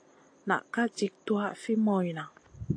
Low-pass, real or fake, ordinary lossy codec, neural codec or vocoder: 9.9 kHz; real; AAC, 48 kbps; none